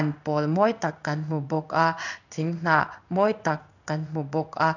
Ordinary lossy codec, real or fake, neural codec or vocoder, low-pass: none; fake; codec, 16 kHz in and 24 kHz out, 1 kbps, XY-Tokenizer; 7.2 kHz